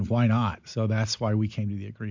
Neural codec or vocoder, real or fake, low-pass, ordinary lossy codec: none; real; 7.2 kHz; MP3, 64 kbps